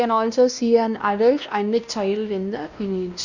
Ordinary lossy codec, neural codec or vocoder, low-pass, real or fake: none; codec, 16 kHz, 1 kbps, X-Codec, WavLM features, trained on Multilingual LibriSpeech; 7.2 kHz; fake